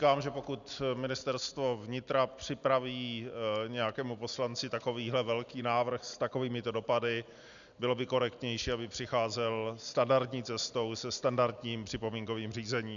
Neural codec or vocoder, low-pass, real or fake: none; 7.2 kHz; real